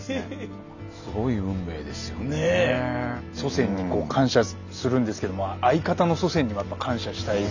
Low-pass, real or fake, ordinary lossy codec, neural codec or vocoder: 7.2 kHz; real; none; none